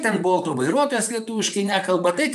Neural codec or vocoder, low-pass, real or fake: vocoder, 44.1 kHz, 128 mel bands, Pupu-Vocoder; 14.4 kHz; fake